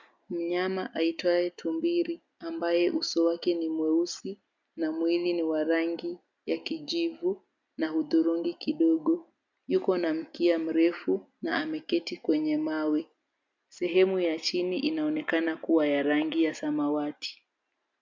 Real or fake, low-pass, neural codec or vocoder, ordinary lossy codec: real; 7.2 kHz; none; MP3, 64 kbps